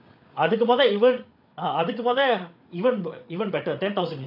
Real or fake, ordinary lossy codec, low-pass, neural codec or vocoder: fake; AAC, 48 kbps; 5.4 kHz; codec, 16 kHz, 8 kbps, FreqCodec, larger model